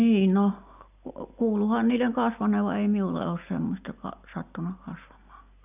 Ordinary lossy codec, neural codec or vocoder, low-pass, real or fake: none; none; 3.6 kHz; real